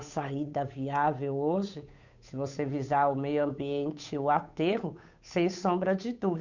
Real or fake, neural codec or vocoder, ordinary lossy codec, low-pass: fake; codec, 16 kHz, 8 kbps, FunCodec, trained on Chinese and English, 25 frames a second; none; 7.2 kHz